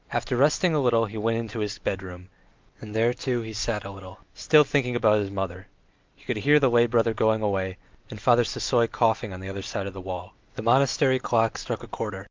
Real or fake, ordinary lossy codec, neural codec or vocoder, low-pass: real; Opus, 24 kbps; none; 7.2 kHz